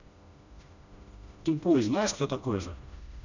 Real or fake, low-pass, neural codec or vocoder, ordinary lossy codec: fake; 7.2 kHz; codec, 16 kHz, 1 kbps, FreqCodec, smaller model; none